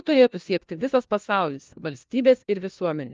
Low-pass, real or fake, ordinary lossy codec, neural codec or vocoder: 7.2 kHz; fake; Opus, 32 kbps; codec, 16 kHz, 1 kbps, FunCodec, trained on LibriTTS, 50 frames a second